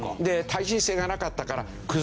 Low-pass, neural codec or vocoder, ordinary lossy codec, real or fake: none; none; none; real